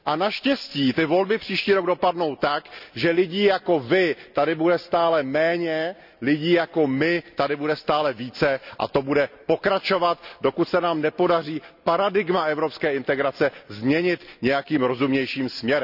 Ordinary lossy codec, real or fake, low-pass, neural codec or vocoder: none; real; 5.4 kHz; none